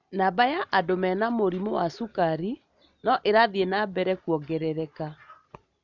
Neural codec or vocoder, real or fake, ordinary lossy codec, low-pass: none; real; none; 7.2 kHz